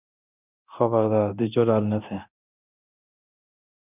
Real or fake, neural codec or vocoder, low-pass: fake; codec, 24 kHz, 0.9 kbps, DualCodec; 3.6 kHz